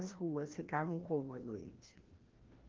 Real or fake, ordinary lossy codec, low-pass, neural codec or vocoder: fake; Opus, 16 kbps; 7.2 kHz; codec, 16 kHz, 1 kbps, FreqCodec, larger model